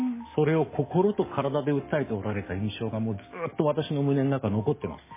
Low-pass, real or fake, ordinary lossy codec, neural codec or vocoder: 3.6 kHz; fake; MP3, 16 kbps; codec, 44.1 kHz, 7.8 kbps, DAC